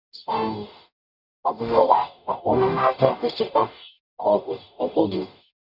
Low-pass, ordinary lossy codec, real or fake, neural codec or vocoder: 5.4 kHz; none; fake; codec, 44.1 kHz, 0.9 kbps, DAC